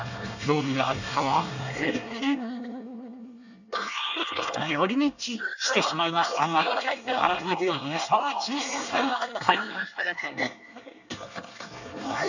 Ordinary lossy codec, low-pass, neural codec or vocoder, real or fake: none; 7.2 kHz; codec, 24 kHz, 1 kbps, SNAC; fake